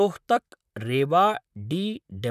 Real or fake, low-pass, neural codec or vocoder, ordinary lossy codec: fake; 14.4 kHz; vocoder, 44.1 kHz, 128 mel bands, Pupu-Vocoder; none